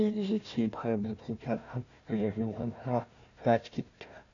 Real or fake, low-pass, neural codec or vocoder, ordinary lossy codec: fake; 7.2 kHz; codec, 16 kHz, 1 kbps, FunCodec, trained on Chinese and English, 50 frames a second; none